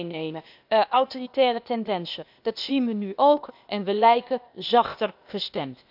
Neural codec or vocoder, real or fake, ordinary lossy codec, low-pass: codec, 16 kHz, 0.8 kbps, ZipCodec; fake; none; 5.4 kHz